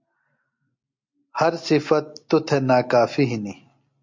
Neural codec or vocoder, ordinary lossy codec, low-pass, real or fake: none; MP3, 48 kbps; 7.2 kHz; real